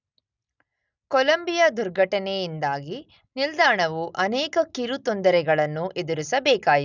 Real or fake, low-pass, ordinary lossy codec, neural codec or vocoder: real; 7.2 kHz; none; none